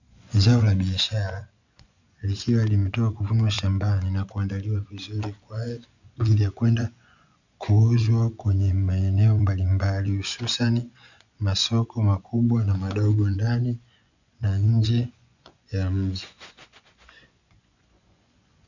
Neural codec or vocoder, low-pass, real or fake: vocoder, 24 kHz, 100 mel bands, Vocos; 7.2 kHz; fake